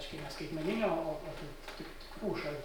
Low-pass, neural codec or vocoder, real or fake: 19.8 kHz; none; real